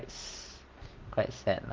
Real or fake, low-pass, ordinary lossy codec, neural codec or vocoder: real; 7.2 kHz; Opus, 16 kbps; none